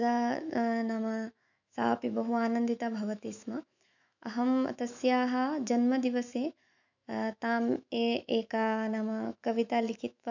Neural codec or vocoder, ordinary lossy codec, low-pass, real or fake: autoencoder, 48 kHz, 128 numbers a frame, DAC-VAE, trained on Japanese speech; none; 7.2 kHz; fake